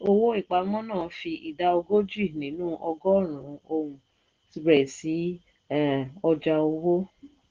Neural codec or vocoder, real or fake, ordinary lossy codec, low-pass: none; real; Opus, 16 kbps; 7.2 kHz